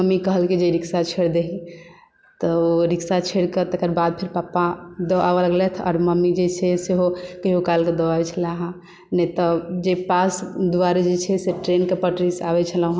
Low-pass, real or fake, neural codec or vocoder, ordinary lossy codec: none; real; none; none